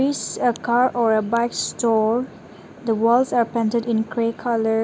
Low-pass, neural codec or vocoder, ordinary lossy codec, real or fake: none; none; none; real